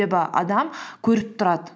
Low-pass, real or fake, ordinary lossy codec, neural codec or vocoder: none; real; none; none